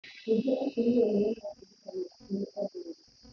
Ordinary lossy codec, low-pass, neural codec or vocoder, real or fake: none; 7.2 kHz; none; real